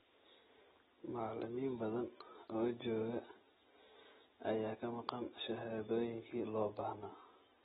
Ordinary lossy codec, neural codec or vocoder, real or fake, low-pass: AAC, 16 kbps; none; real; 9.9 kHz